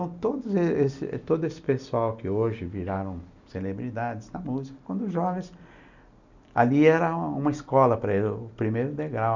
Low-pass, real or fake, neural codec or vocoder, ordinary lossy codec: 7.2 kHz; real; none; none